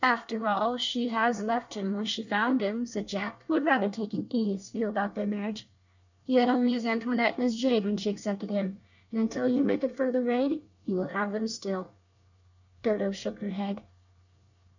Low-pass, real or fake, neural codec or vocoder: 7.2 kHz; fake; codec, 24 kHz, 1 kbps, SNAC